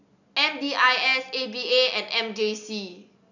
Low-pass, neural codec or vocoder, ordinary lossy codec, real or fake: 7.2 kHz; none; none; real